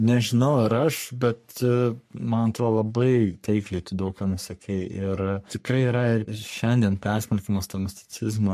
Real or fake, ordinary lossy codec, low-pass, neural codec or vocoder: fake; MP3, 64 kbps; 14.4 kHz; codec, 44.1 kHz, 3.4 kbps, Pupu-Codec